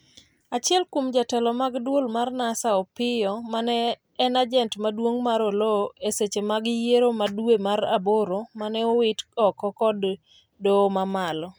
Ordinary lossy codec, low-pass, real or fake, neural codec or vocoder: none; none; real; none